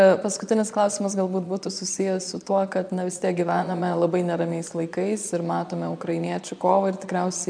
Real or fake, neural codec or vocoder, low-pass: fake; vocoder, 22.05 kHz, 80 mel bands, Vocos; 9.9 kHz